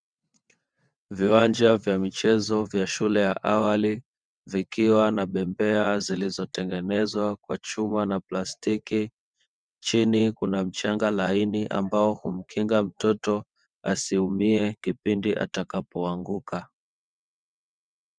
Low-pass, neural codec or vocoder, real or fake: 9.9 kHz; vocoder, 22.05 kHz, 80 mel bands, WaveNeXt; fake